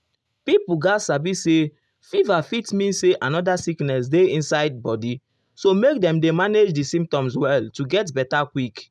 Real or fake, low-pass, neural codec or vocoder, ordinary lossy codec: real; none; none; none